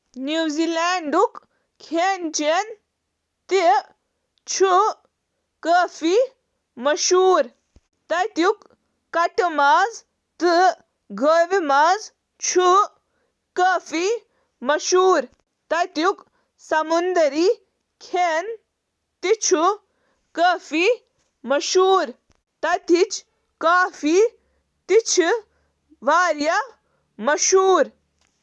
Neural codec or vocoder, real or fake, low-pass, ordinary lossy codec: none; real; none; none